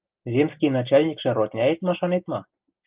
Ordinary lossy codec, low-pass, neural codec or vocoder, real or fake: Opus, 24 kbps; 3.6 kHz; none; real